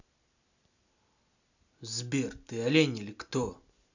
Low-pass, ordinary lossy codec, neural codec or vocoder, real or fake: 7.2 kHz; none; none; real